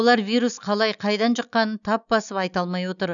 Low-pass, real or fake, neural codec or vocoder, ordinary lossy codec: 7.2 kHz; real; none; none